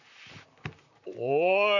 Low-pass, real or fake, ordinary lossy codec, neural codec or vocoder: 7.2 kHz; fake; none; vocoder, 44.1 kHz, 128 mel bands, Pupu-Vocoder